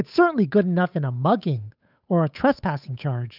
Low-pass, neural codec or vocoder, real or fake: 5.4 kHz; codec, 16 kHz, 8 kbps, FunCodec, trained on Chinese and English, 25 frames a second; fake